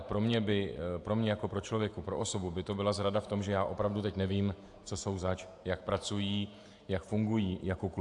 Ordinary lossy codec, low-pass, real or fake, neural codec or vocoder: AAC, 64 kbps; 10.8 kHz; fake; vocoder, 48 kHz, 128 mel bands, Vocos